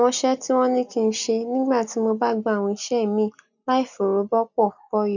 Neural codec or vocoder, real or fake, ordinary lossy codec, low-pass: none; real; none; 7.2 kHz